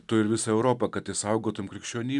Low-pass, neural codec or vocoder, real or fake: 10.8 kHz; none; real